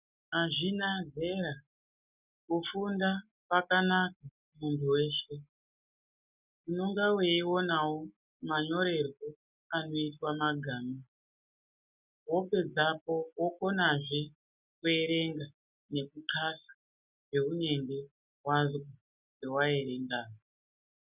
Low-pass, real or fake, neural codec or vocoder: 3.6 kHz; real; none